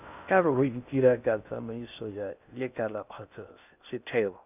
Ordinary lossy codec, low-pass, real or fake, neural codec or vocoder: none; 3.6 kHz; fake; codec, 16 kHz in and 24 kHz out, 0.6 kbps, FocalCodec, streaming, 2048 codes